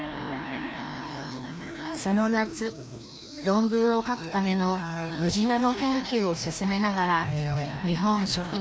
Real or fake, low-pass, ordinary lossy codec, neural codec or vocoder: fake; none; none; codec, 16 kHz, 1 kbps, FreqCodec, larger model